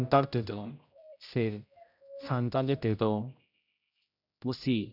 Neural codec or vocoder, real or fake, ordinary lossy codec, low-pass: codec, 16 kHz, 0.5 kbps, X-Codec, HuBERT features, trained on general audio; fake; none; 5.4 kHz